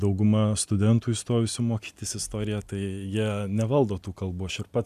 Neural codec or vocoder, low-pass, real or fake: none; 14.4 kHz; real